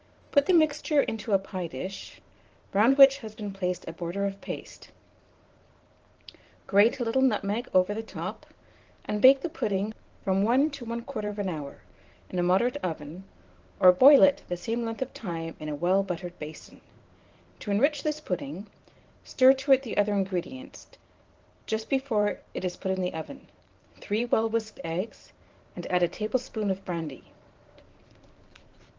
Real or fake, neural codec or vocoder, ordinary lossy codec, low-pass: fake; vocoder, 44.1 kHz, 128 mel bands every 512 samples, BigVGAN v2; Opus, 16 kbps; 7.2 kHz